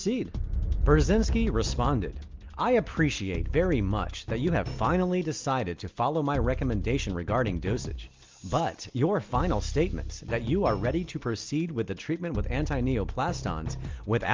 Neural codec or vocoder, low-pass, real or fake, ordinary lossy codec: none; 7.2 kHz; real; Opus, 24 kbps